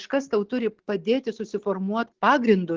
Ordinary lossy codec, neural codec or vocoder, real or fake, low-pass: Opus, 16 kbps; none; real; 7.2 kHz